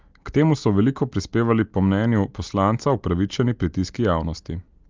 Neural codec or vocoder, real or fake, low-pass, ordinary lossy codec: none; real; 7.2 kHz; Opus, 24 kbps